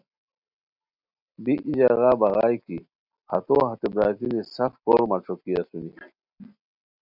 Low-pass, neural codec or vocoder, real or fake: 5.4 kHz; none; real